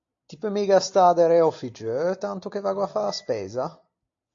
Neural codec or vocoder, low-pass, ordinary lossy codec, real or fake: none; 7.2 kHz; AAC, 48 kbps; real